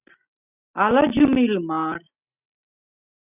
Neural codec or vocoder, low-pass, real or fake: none; 3.6 kHz; real